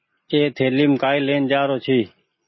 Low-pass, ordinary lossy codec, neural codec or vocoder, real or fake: 7.2 kHz; MP3, 24 kbps; none; real